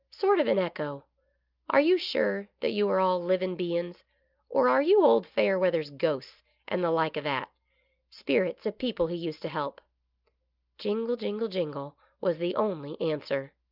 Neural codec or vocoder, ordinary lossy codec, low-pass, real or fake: none; Opus, 24 kbps; 5.4 kHz; real